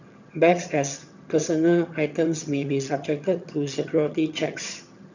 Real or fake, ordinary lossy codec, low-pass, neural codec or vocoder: fake; none; 7.2 kHz; vocoder, 22.05 kHz, 80 mel bands, HiFi-GAN